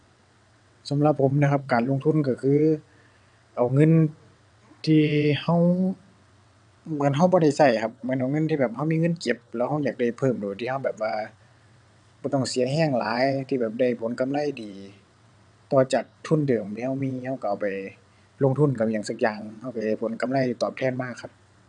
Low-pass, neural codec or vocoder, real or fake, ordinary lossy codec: 9.9 kHz; vocoder, 22.05 kHz, 80 mel bands, WaveNeXt; fake; none